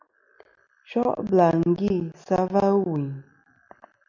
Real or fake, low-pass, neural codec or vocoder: real; 7.2 kHz; none